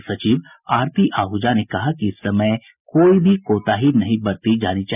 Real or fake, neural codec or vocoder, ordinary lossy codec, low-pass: real; none; none; 3.6 kHz